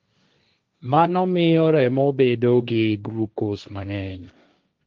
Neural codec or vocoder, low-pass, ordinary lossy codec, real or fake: codec, 16 kHz, 1.1 kbps, Voila-Tokenizer; 7.2 kHz; Opus, 32 kbps; fake